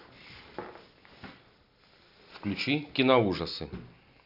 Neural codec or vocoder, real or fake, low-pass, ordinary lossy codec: none; real; 5.4 kHz; none